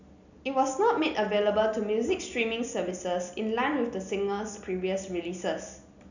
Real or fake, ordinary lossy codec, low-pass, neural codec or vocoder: real; none; 7.2 kHz; none